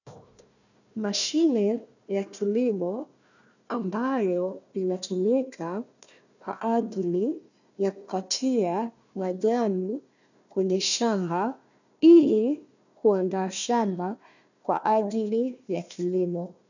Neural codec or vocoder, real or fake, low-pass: codec, 16 kHz, 1 kbps, FunCodec, trained on Chinese and English, 50 frames a second; fake; 7.2 kHz